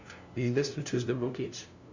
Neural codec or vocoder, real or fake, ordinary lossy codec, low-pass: codec, 16 kHz, 0.5 kbps, FunCodec, trained on LibriTTS, 25 frames a second; fake; Opus, 64 kbps; 7.2 kHz